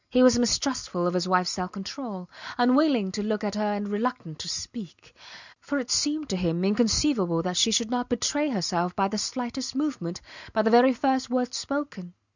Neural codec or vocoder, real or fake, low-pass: none; real; 7.2 kHz